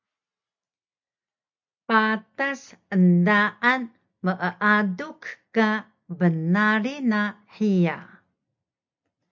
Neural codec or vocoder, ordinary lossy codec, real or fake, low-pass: none; MP3, 64 kbps; real; 7.2 kHz